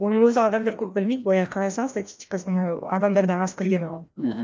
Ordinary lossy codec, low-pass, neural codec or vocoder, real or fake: none; none; codec, 16 kHz, 1 kbps, FreqCodec, larger model; fake